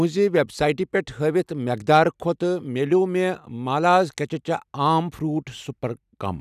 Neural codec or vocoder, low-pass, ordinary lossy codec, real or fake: none; 14.4 kHz; none; real